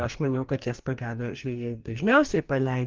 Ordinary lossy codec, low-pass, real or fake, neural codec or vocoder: Opus, 32 kbps; 7.2 kHz; fake; codec, 44.1 kHz, 2.6 kbps, DAC